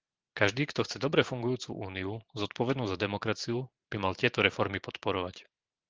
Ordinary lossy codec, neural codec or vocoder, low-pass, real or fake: Opus, 16 kbps; vocoder, 44.1 kHz, 128 mel bands every 512 samples, BigVGAN v2; 7.2 kHz; fake